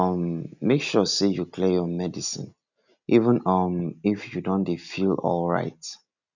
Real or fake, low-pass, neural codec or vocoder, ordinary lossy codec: real; 7.2 kHz; none; none